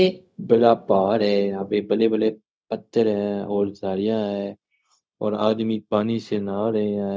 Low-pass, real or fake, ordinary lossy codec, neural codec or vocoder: none; fake; none; codec, 16 kHz, 0.4 kbps, LongCat-Audio-Codec